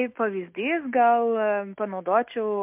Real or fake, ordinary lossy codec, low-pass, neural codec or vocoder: fake; MP3, 32 kbps; 3.6 kHz; autoencoder, 48 kHz, 128 numbers a frame, DAC-VAE, trained on Japanese speech